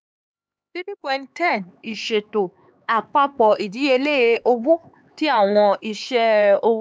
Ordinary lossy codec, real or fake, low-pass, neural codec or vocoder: none; fake; none; codec, 16 kHz, 4 kbps, X-Codec, HuBERT features, trained on LibriSpeech